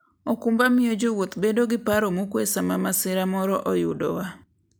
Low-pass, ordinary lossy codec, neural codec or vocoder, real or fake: none; none; none; real